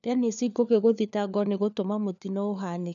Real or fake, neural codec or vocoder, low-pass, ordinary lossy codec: fake; codec, 16 kHz, 4 kbps, FunCodec, trained on LibriTTS, 50 frames a second; 7.2 kHz; none